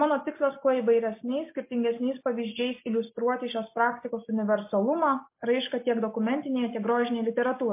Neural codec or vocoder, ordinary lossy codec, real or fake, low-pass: none; MP3, 24 kbps; real; 3.6 kHz